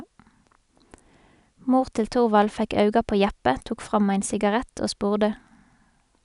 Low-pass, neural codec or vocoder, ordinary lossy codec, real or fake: 10.8 kHz; none; none; real